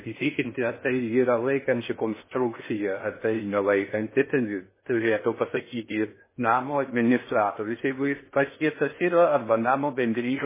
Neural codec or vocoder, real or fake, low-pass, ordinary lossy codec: codec, 16 kHz in and 24 kHz out, 0.6 kbps, FocalCodec, streaming, 4096 codes; fake; 3.6 kHz; MP3, 16 kbps